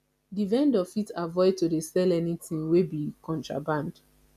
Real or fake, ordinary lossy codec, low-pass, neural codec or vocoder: real; none; 14.4 kHz; none